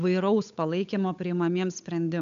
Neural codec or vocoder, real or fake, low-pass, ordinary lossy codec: codec, 16 kHz, 8 kbps, FunCodec, trained on Chinese and English, 25 frames a second; fake; 7.2 kHz; MP3, 64 kbps